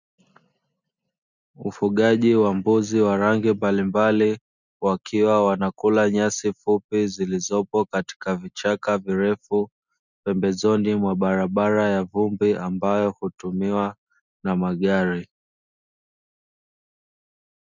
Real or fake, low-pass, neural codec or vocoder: real; 7.2 kHz; none